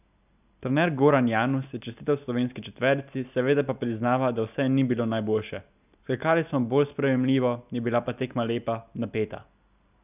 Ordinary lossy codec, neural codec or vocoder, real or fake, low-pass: none; none; real; 3.6 kHz